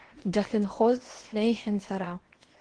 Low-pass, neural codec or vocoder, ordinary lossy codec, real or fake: 9.9 kHz; codec, 16 kHz in and 24 kHz out, 0.8 kbps, FocalCodec, streaming, 65536 codes; Opus, 16 kbps; fake